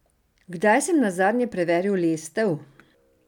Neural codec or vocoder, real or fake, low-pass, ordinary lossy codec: none; real; 19.8 kHz; none